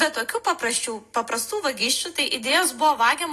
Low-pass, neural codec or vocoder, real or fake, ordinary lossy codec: 14.4 kHz; none; real; AAC, 48 kbps